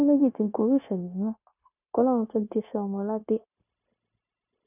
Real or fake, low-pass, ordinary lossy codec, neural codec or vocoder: fake; 3.6 kHz; none; codec, 16 kHz, 0.9 kbps, LongCat-Audio-Codec